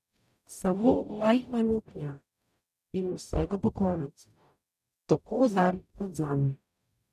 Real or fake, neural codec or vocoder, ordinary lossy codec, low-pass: fake; codec, 44.1 kHz, 0.9 kbps, DAC; none; 14.4 kHz